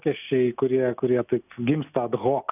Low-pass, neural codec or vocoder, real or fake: 3.6 kHz; none; real